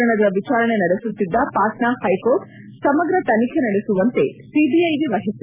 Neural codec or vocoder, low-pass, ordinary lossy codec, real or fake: none; 3.6 kHz; none; real